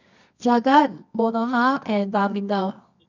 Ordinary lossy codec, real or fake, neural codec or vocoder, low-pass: none; fake; codec, 24 kHz, 0.9 kbps, WavTokenizer, medium music audio release; 7.2 kHz